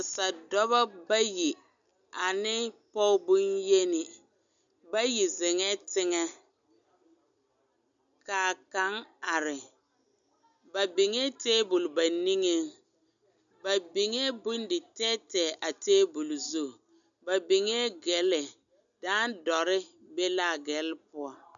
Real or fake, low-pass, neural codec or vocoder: real; 7.2 kHz; none